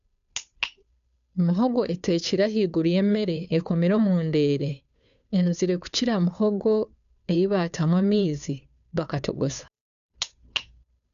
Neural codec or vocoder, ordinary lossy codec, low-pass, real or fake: codec, 16 kHz, 2 kbps, FunCodec, trained on Chinese and English, 25 frames a second; none; 7.2 kHz; fake